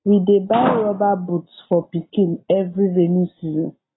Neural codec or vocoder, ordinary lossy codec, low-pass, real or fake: none; AAC, 16 kbps; 7.2 kHz; real